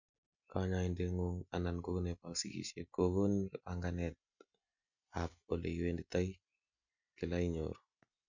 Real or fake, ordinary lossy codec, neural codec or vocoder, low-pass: real; none; none; 7.2 kHz